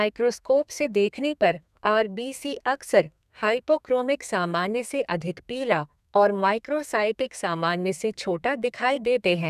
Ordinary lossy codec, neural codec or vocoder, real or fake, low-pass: none; codec, 32 kHz, 1.9 kbps, SNAC; fake; 14.4 kHz